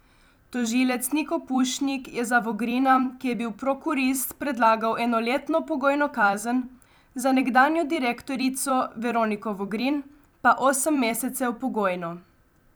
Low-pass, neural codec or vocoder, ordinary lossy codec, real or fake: none; vocoder, 44.1 kHz, 128 mel bands every 256 samples, BigVGAN v2; none; fake